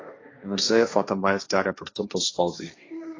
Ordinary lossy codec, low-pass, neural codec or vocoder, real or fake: AAC, 32 kbps; 7.2 kHz; codec, 16 kHz, 1.1 kbps, Voila-Tokenizer; fake